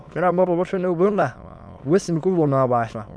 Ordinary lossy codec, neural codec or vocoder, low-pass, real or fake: none; autoencoder, 22.05 kHz, a latent of 192 numbers a frame, VITS, trained on many speakers; none; fake